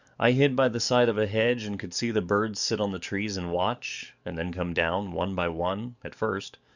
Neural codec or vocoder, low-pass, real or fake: codec, 44.1 kHz, 7.8 kbps, DAC; 7.2 kHz; fake